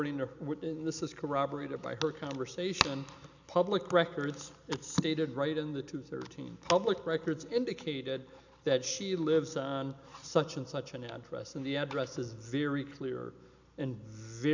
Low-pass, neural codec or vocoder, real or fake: 7.2 kHz; none; real